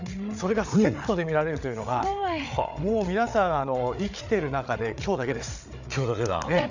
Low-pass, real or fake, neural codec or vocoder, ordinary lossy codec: 7.2 kHz; fake; codec, 16 kHz, 8 kbps, FreqCodec, larger model; none